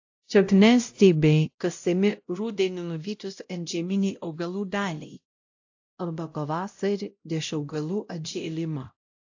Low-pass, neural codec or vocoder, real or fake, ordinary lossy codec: 7.2 kHz; codec, 16 kHz, 0.5 kbps, X-Codec, WavLM features, trained on Multilingual LibriSpeech; fake; AAC, 48 kbps